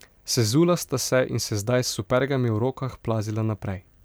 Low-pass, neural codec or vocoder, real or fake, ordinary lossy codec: none; vocoder, 44.1 kHz, 128 mel bands every 512 samples, BigVGAN v2; fake; none